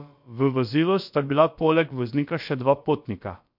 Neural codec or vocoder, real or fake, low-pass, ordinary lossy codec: codec, 16 kHz, about 1 kbps, DyCAST, with the encoder's durations; fake; 5.4 kHz; MP3, 48 kbps